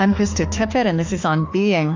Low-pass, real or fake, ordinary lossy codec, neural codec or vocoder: 7.2 kHz; fake; AAC, 48 kbps; autoencoder, 48 kHz, 32 numbers a frame, DAC-VAE, trained on Japanese speech